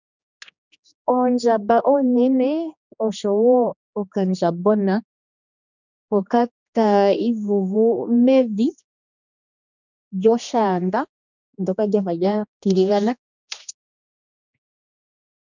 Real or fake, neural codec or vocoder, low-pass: fake; codec, 16 kHz, 2 kbps, X-Codec, HuBERT features, trained on general audio; 7.2 kHz